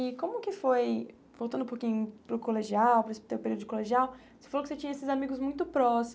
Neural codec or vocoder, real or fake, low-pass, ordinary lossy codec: none; real; none; none